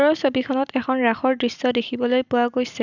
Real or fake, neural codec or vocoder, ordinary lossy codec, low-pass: real; none; none; 7.2 kHz